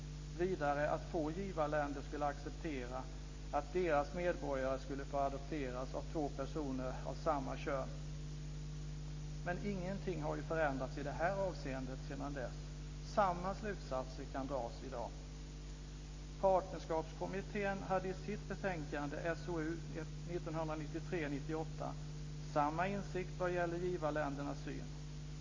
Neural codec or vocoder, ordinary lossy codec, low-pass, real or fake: none; MP3, 32 kbps; 7.2 kHz; real